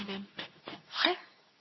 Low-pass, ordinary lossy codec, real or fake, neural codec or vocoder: 7.2 kHz; MP3, 24 kbps; fake; codec, 24 kHz, 0.9 kbps, WavTokenizer, medium speech release version 2